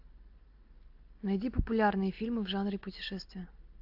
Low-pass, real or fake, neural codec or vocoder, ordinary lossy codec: 5.4 kHz; real; none; AAC, 48 kbps